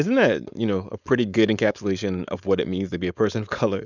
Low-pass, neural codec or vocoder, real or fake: 7.2 kHz; codec, 16 kHz, 4.8 kbps, FACodec; fake